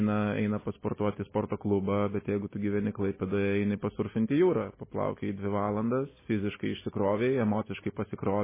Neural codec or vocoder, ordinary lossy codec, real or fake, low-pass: none; MP3, 16 kbps; real; 3.6 kHz